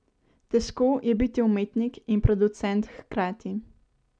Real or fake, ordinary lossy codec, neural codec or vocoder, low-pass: real; none; none; 9.9 kHz